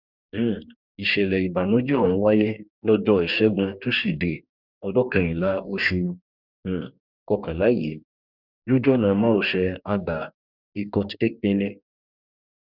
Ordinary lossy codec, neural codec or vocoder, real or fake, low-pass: none; codec, 44.1 kHz, 2.6 kbps, DAC; fake; 5.4 kHz